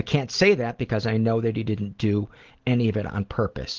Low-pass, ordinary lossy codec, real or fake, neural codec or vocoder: 7.2 kHz; Opus, 16 kbps; real; none